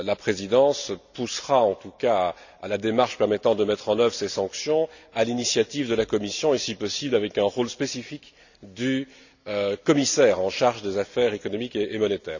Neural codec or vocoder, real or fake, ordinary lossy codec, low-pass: none; real; none; 7.2 kHz